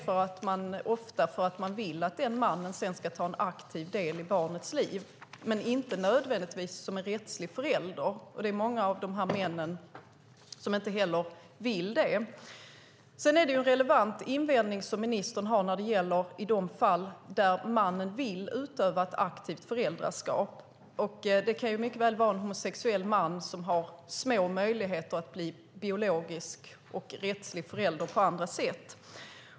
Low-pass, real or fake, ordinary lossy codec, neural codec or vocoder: none; real; none; none